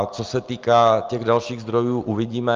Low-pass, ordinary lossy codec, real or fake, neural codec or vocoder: 7.2 kHz; Opus, 24 kbps; real; none